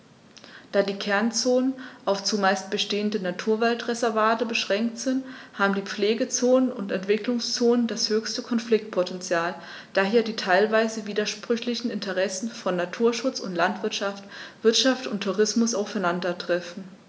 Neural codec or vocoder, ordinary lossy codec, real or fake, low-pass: none; none; real; none